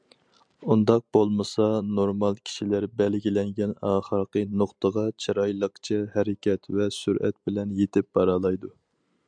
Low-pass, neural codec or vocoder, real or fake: 9.9 kHz; none; real